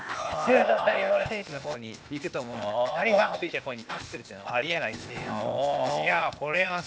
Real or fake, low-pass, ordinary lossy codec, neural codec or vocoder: fake; none; none; codec, 16 kHz, 0.8 kbps, ZipCodec